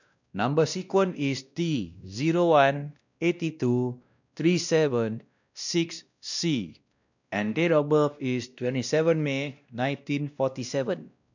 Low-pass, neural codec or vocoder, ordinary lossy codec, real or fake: 7.2 kHz; codec, 16 kHz, 1 kbps, X-Codec, WavLM features, trained on Multilingual LibriSpeech; none; fake